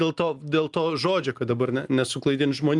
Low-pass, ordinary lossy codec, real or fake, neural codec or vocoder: 10.8 kHz; Opus, 32 kbps; real; none